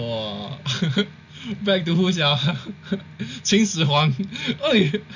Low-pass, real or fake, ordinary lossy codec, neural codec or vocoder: 7.2 kHz; real; none; none